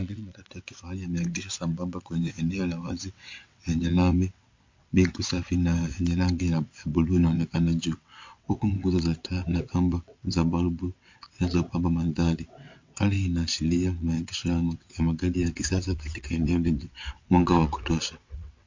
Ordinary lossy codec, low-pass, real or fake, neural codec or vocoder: MP3, 48 kbps; 7.2 kHz; fake; vocoder, 22.05 kHz, 80 mel bands, WaveNeXt